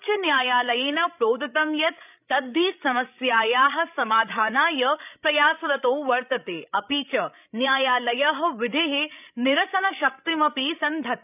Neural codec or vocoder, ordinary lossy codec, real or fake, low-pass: codec, 16 kHz, 16 kbps, FreqCodec, larger model; none; fake; 3.6 kHz